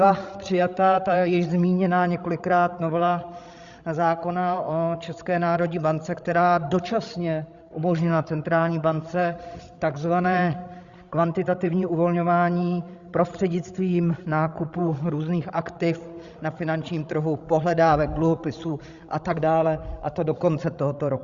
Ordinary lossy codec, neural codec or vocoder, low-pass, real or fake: Opus, 64 kbps; codec, 16 kHz, 16 kbps, FreqCodec, larger model; 7.2 kHz; fake